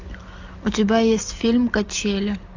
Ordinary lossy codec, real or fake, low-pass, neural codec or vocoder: AAC, 48 kbps; real; 7.2 kHz; none